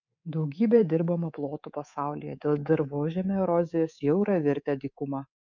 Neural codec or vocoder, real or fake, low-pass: codec, 24 kHz, 3.1 kbps, DualCodec; fake; 7.2 kHz